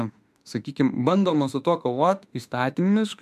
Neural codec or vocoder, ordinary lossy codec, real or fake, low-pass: autoencoder, 48 kHz, 32 numbers a frame, DAC-VAE, trained on Japanese speech; MP3, 96 kbps; fake; 14.4 kHz